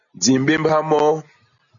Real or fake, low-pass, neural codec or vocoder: real; 7.2 kHz; none